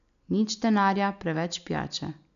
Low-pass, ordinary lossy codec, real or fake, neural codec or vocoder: 7.2 kHz; MP3, 64 kbps; real; none